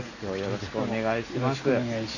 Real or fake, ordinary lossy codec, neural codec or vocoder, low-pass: real; none; none; 7.2 kHz